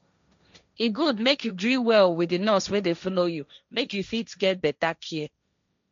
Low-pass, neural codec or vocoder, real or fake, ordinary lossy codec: 7.2 kHz; codec, 16 kHz, 1.1 kbps, Voila-Tokenizer; fake; MP3, 64 kbps